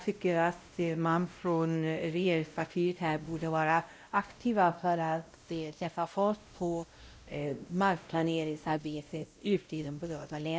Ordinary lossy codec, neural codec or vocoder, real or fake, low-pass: none; codec, 16 kHz, 0.5 kbps, X-Codec, WavLM features, trained on Multilingual LibriSpeech; fake; none